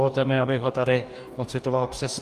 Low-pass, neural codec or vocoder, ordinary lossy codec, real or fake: 14.4 kHz; codec, 44.1 kHz, 2.6 kbps, DAC; Opus, 32 kbps; fake